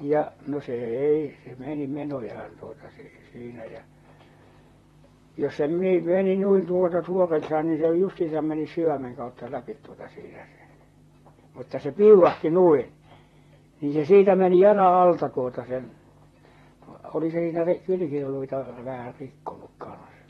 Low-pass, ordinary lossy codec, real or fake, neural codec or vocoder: 19.8 kHz; AAC, 32 kbps; fake; vocoder, 44.1 kHz, 128 mel bands, Pupu-Vocoder